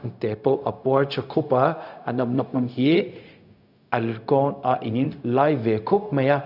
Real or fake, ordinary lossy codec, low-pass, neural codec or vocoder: fake; none; 5.4 kHz; codec, 16 kHz, 0.4 kbps, LongCat-Audio-Codec